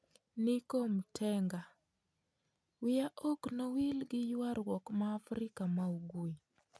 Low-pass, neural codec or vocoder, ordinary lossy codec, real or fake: none; none; none; real